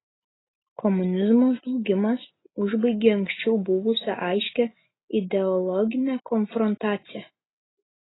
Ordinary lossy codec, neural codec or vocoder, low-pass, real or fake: AAC, 16 kbps; none; 7.2 kHz; real